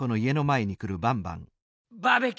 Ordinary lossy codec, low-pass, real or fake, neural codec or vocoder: none; none; real; none